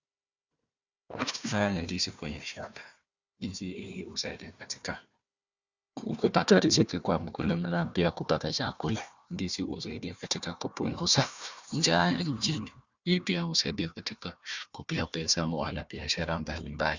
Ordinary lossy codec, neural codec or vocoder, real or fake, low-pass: Opus, 64 kbps; codec, 16 kHz, 1 kbps, FunCodec, trained on Chinese and English, 50 frames a second; fake; 7.2 kHz